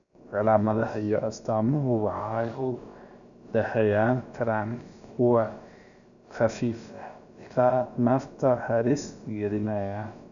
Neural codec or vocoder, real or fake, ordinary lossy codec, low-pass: codec, 16 kHz, about 1 kbps, DyCAST, with the encoder's durations; fake; none; 7.2 kHz